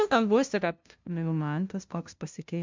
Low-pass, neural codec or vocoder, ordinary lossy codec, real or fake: 7.2 kHz; codec, 16 kHz, 0.5 kbps, FunCodec, trained on LibriTTS, 25 frames a second; MP3, 64 kbps; fake